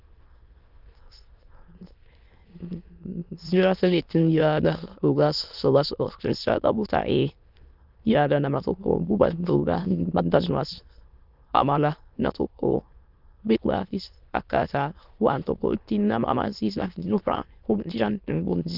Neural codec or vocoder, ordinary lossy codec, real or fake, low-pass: autoencoder, 22.05 kHz, a latent of 192 numbers a frame, VITS, trained on many speakers; Opus, 24 kbps; fake; 5.4 kHz